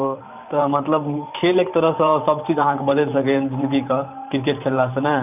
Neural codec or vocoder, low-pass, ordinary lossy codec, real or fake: vocoder, 44.1 kHz, 128 mel bands every 512 samples, BigVGAN v2; 3.6 kHz; none; fake